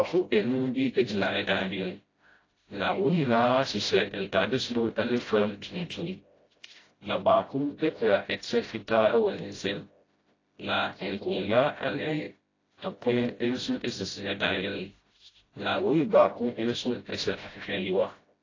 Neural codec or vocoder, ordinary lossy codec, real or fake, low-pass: codec, 16 kHz, 0.5 kbps, FreqCodec, smaller model; AAC, 32 kbps; fake; 7.2 kHz